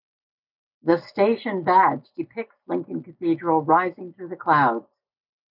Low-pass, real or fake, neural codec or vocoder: 5.4 kHz; real; none